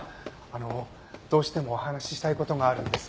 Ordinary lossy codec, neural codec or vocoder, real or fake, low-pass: none; none; real; none